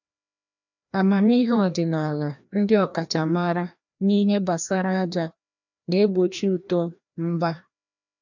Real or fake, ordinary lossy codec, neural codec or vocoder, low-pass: fake; none; codec, 16 kHz, 1 kbps, FreqCodec, larger model; 7.2 kHz